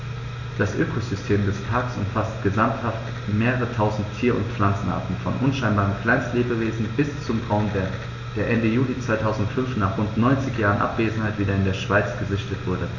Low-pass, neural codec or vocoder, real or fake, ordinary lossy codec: 7.2 kHz; none; real; none